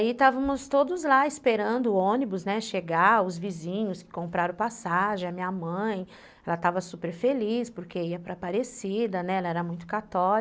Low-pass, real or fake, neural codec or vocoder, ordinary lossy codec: none; real; none; none